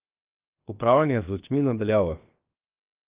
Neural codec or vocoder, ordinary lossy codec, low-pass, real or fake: codec, 16 kHz, 0.7 kbps, FocalCodec; Opus, 24 kbps; 3.6 kHz; fake